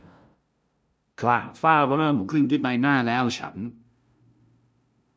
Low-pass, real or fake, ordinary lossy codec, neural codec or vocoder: none; fake; none; codec, 16 kHz, 0.5 kbps, FunCodec, trained on LibriTTS, 25 frames a second